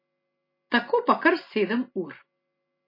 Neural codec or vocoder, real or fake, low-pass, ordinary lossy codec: none; real; 5.4 kHz; MP3, 24 kbps